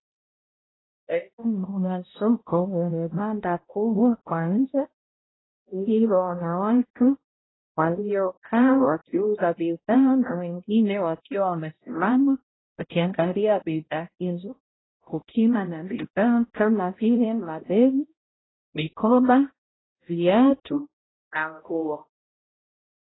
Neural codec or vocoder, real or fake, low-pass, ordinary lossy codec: codec, 16 kHz, 0.5 kbps, X-Codec, HuBERT features, trained on balanced general audio; fake; 7.2 kHz; AAC, 16 kbps